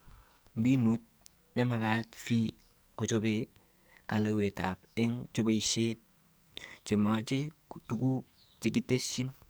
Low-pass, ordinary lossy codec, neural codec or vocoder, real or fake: none; none; codec, 44.1 kHz, 2.6 kbps, SNAC; fake